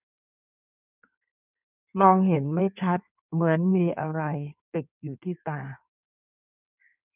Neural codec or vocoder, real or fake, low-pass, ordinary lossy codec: codec, 16 kHz in and 24 kHz out, 1.1 kbps, FireRedTTS-2 codec; fake; 3.6 kHz; none